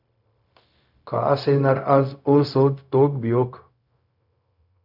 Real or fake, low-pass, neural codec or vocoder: fake; 5.4 kHz; codec, 16 kHz, 0.4 kbps, LongCat-Audio-Codec